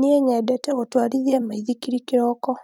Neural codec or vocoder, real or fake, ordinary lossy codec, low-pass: vocoder, 44.1 kHz, 128 mel bands every 256 samples, BigVGAN v2; fake; none; 19.8 kHz